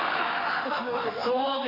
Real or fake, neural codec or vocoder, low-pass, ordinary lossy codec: fake; autoencoder, 48 kHz, 32 numbers a frame, DAC-VAE, trained on Japanese speech; 5.4 kHz; none